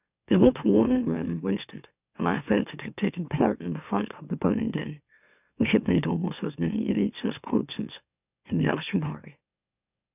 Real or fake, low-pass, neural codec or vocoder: fake; 3.6 kHz; autoencoder, 44.1 kHz, a latent of 192 numbers a frame, MeloTTS